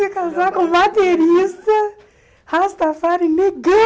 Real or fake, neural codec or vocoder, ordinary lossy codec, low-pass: real; none; none; none